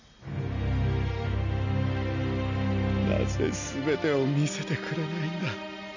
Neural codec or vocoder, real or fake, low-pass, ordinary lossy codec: none; real; 7.2 kHz; none